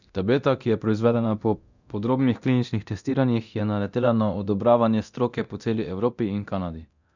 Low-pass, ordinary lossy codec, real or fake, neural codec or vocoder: 7.2 kHz; none; fake; codec, 24 kHz, 0.9 kbps, DualCodec